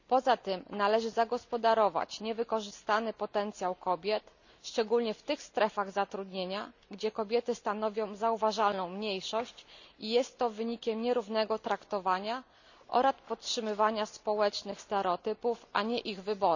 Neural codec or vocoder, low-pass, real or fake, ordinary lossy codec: none; 7.2 kHz; real; none